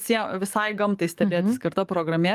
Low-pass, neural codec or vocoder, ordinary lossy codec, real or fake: 14.4 kHz; codec, 44.1 kHz, 7.8 kbps, DAC; Opus, 32 kbps; fake